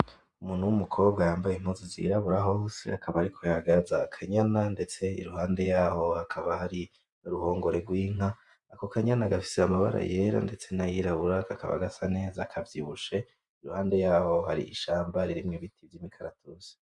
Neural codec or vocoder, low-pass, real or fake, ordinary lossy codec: vocoder, 48 kHz, 128 mel bands, Vocos; 10.8 kHz; fake; Opus, 64 kbps